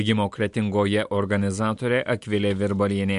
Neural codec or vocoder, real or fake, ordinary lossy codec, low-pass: none; real; MP3, 64 kbps; 10.8 kHz